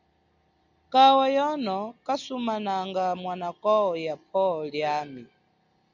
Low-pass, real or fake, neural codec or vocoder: 7.2 kHz; real; none